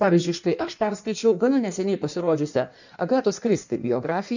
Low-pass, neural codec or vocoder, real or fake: 7.2 kHz; codec, 16 kHz in and 24 kHz out, 1.1 kbps, FireRedTTS-2 codec; fake